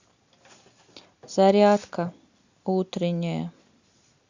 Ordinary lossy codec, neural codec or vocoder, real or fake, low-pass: Opus, 64 kbps; none; real; 7.2 kHz